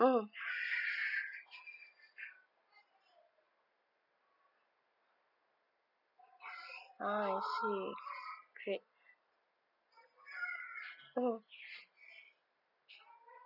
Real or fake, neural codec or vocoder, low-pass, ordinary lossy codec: real; none; 5.4 kHz; none